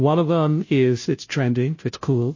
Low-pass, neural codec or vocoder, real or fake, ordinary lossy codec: 7.2 kHz; codec, 16 kHz, 0.5 kbps, FunCodec, trained on Chinese and English, 25 frames a second; fake; MP3, 32 kbps